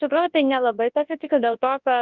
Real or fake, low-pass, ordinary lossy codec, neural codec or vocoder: fake; 7.2 kHz; Opus, 24 kbps; codec, 24 kHz, 0.9 kbps, WavTokenizer, large speech release